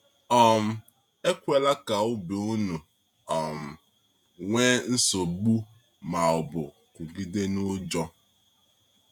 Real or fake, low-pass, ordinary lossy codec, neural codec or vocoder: fake; 19.8 kHz; none; vocoder, 48 kHz, 128 mel bands, Vocos